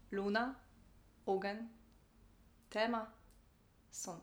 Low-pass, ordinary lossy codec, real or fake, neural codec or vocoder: none; none; real; none